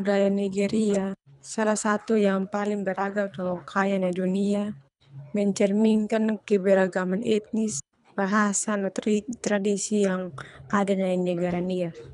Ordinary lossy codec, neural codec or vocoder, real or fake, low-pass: none; codec, 24 kHz, 3 kbps, HILCodec; fake; 10.8 kHz